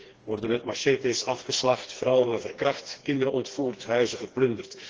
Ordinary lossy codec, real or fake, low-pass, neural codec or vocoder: Opus, 16 kbps; fake; 7.2 kHz; codec, 16 kHz, 2 kbps, FreqCodec, smaller model